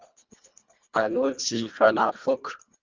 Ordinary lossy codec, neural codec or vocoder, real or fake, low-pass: Opus, 32 kbps; codec, 24 kHz, 1.5 kbps, HILCodec; fake; 7.2 kHz